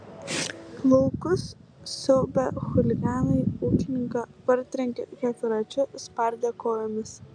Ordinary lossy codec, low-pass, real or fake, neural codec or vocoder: MP3, 96 kbps; 9.9 kHz; real; none